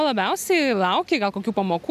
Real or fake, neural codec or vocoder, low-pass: real; none; 14.4 kHz